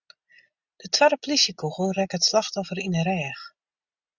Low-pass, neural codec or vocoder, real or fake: 7.2 kHz; none; real